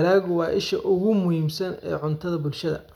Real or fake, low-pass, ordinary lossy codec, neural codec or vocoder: real; 19.8 kHz; none; none